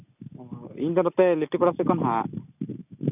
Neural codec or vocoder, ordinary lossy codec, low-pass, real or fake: none; none; 3.6 kHz; real